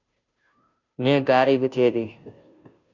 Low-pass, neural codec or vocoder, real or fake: 7.2 kHz; codec, 16 kHz, 0.5 kbps, FunCodec, trained on Chinese and English, 25 frames a second; fake